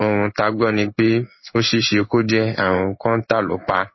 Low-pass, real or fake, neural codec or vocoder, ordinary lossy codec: 7.2 kHz; fake; codec, 16 kHz in and 24 kHz out, 1 kbps, XY-Tokenizer; MP3, 24 kbps